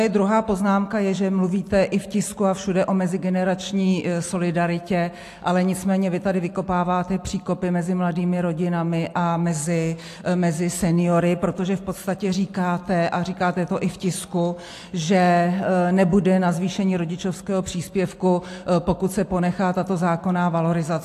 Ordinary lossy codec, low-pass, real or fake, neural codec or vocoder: AAC, 64 kbps; 14.4 kHz; real; none